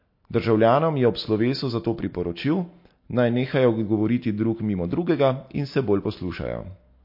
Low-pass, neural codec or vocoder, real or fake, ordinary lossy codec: 5.4 kHz; none; real; MP3, 32 kbps